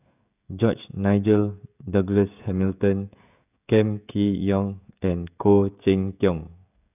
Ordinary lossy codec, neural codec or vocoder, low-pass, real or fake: none; codec, 16 kHz, 16 kbps, FreqCodec, smaller model; 3.6 kHz; fake